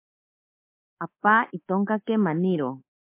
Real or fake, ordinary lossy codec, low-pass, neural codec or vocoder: fake; MP3, 24 kbps; 3.6 kHz; codec, 24 kHz, 3.1 kbps, DualCodec